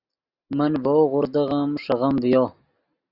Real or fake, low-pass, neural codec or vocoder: real; 5.4 kHz; none